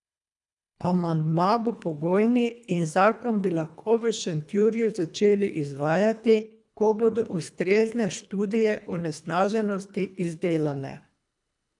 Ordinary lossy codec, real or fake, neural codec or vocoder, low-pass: none; fake; codec, 24 kHz, 1.5 kbps, HILCodec; 10.8 kHz